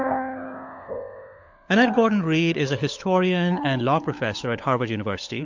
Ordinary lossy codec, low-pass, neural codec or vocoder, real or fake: MP3, 48 kbps; 7.2 kHz; codec, 16 kHz, 16 kbps, FunCodec, trained on LibriTTS, 50 frames a second; fake